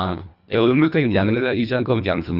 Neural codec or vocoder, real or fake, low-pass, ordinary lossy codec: codec, 24 kHz, 1.5 kbps, HILCodec; fake; 5.4 kHz; none